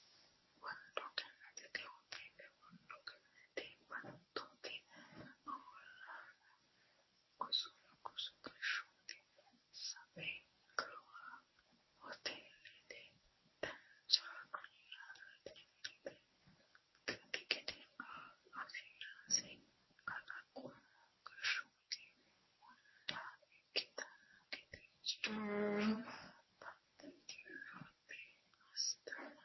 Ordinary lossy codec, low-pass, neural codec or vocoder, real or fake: MP3, 24 kbps; 7.2 kHz; codec, 24 kHz, 0.9 kbps, WavTokenizer, medium speech release version 1; fake